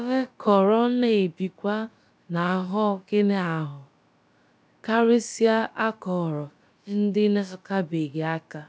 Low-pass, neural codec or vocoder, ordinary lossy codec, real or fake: none; codec, 16 kHz, about 1 kbps, DyCAST, with the encoder's durations; none; fake